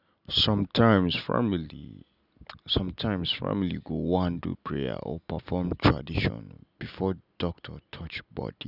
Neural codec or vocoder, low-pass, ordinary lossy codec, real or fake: none; 5.4 kHz; none; real